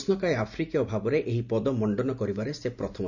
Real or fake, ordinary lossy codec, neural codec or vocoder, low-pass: real; none; none; 7.2 kHz